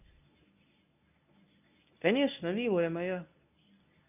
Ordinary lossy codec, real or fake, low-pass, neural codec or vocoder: none; fake; 3.6 kHz; codec, 24 kHz, 0.9 kbps, WavTokenizer, medium speech release version 1